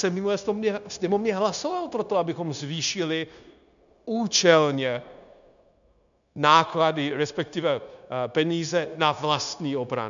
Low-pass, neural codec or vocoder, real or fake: 7.2 kHz; codec, 16 kHz, 0.9 kbps, LongCat-Audio-Codec; fake